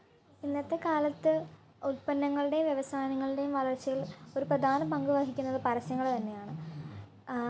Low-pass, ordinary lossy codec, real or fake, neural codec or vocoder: none; none; real; none